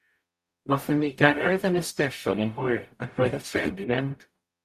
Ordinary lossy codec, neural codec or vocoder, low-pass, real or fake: Opus, 64 kbps; codec, 44.1 kHz, 0.9 kbps, DAC; 14.4 kHz; fake